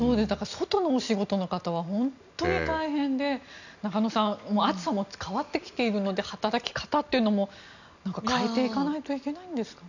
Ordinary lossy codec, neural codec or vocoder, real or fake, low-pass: none; none; real; 7.2 kHz